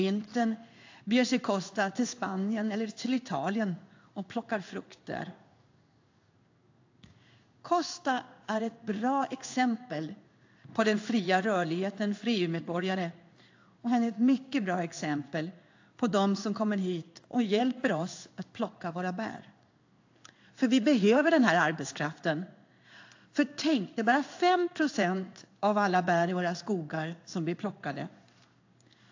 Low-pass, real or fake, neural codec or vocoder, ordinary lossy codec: 7.2 kHz; fake; codec, 16 kHz in and 24 kHz out, 1 kbps, XY-Tokenizer; AAC, 48 kbps